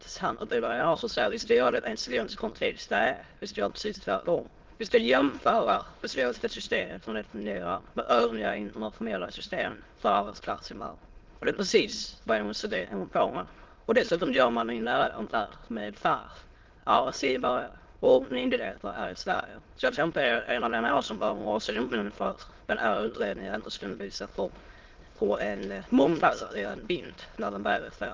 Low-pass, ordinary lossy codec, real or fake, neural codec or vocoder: 7.2 kHz; Opus, 16 kbps; fake; autoencoder, 22.05 kHz, a latent of 192 numbers a frame, VITS, trained on many speakers